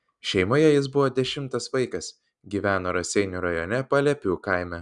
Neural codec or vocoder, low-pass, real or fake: none; 10.8 kHz; real